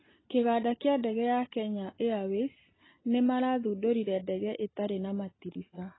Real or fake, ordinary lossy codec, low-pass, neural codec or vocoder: real; AAC, 16 kbps; 7.2 kHz; none